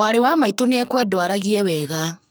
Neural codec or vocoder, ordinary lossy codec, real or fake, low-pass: codec, 44.1 kHz, 2.6 kbps, SNAC; none; fake; none